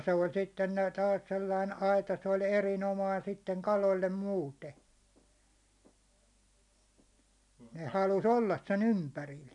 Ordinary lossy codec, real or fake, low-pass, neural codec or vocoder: none; real; 10.8 kHz; none